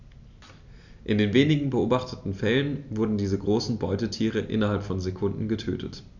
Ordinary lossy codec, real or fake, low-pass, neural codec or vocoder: none; real; 7.2 kHz; none